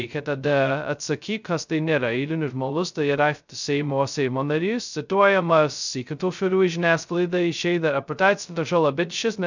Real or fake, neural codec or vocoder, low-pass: fake; codec, 16 kHz, 0.2 kbps, FocalCodec; 7.2 kHz